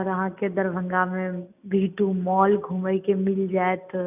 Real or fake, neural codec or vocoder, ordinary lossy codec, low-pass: real; none; none; 3.6 kHz